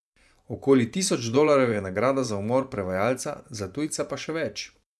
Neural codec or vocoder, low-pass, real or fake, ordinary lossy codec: vocoder, 24 kHz, 100 mel bands, Vocos; none; fake; none